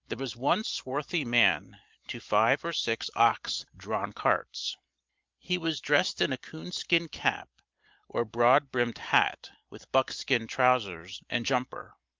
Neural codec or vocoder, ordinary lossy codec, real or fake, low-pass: none; Opus, 24 kbps; real; 7.2 kHz